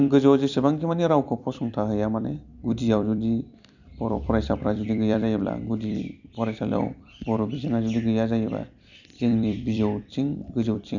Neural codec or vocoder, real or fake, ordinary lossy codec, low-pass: vocoder, 44.1 kHz, 128 mel bands every 256 samples, BigVGAN v2; fake; none; 7.2 kHz